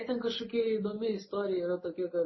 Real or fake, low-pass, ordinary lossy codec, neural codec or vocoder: real; 7.2 kHz; MP3, 24 kbps; none